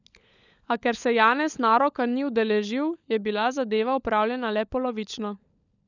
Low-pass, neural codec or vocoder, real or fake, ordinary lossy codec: 7.2 kHz; codec, 16 kHz, 16 kbps, FunCodec, trained on LibriTTS, 50 frames a second; fake; none